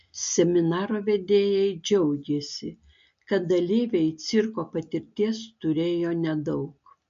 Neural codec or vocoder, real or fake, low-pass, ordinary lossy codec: none; real; 7.2 kHz; MP3, 48 kbps